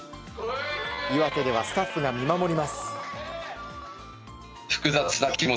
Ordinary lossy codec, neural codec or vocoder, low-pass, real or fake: none; none; none; real